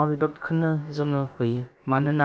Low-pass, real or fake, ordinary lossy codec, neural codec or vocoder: none; fake; none; codec, 16 kHz, about 1 kbps, DyCAST, with the encoder's durations